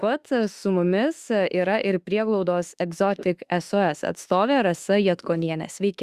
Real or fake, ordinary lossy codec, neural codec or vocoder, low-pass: fake; Opus, 64 kbps; autoencoder, 48 kHz, 32 numbers a frame, DAC-VAE, trained on Japanese speech; 14.4 kHz